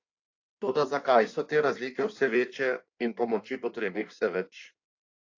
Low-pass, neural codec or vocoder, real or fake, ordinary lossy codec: 7.2 kHz; codec, 16 kHz in and 24 kHz out, 1.1 kbps, FireRedTTS-2 codec; fake; AAC, 48 kbps